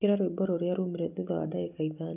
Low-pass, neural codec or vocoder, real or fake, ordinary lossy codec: 3.6 kHz; none; real; none